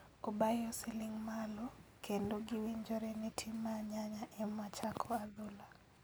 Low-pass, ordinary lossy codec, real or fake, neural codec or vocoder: none; none; real; none